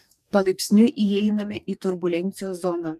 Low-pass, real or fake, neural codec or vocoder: 14.4 kHz; fake; codec, 44.1 kHz, 2.6 kbps, DAC